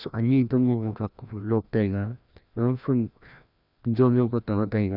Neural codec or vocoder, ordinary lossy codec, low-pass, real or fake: codec, 16 kHz, 1 kbps, FreqCodec, larger model; none; 5.4 kHz; fake